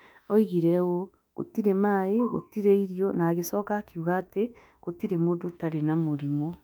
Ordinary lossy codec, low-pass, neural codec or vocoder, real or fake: none; 19.8 kHz; autoencoder, 48 kHz, 32 numbers a frame, DAC-VAE, trained on Japanese speech; fake